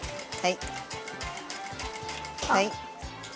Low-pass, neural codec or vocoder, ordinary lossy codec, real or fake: none; none; none; real